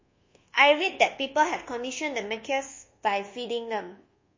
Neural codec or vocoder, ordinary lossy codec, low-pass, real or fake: codec, 24 kHz, 1.2 kbps, DualCodec; MP3, 32 kbps; 7.2 kHz; fake